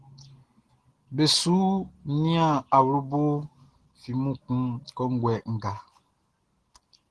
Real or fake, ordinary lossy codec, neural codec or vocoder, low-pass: real; Opus, 16 kbps; none; 10.8 kHz